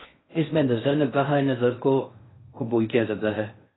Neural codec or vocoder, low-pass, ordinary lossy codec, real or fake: codec, 16 kHz in and 24 kHz out, 0.6 kbps, FocalCodec, streaming, 4096 codes; 7.2 kHz; AAC, 16 kbps; fake